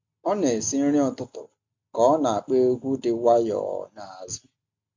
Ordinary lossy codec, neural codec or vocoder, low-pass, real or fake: MP3, 48 kbps; none; 7.2 kHz; real